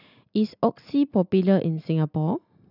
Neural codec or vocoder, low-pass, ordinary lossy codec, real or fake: none; 5.4 kHz; none; real